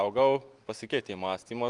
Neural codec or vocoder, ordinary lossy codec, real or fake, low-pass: none; Opus, 32 kbps; real; 10.8 kHz